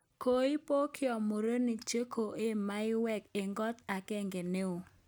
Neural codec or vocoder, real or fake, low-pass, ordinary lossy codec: none; real; none; none